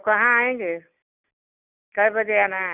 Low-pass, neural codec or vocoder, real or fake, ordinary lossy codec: 3.6 kHz; none; real; none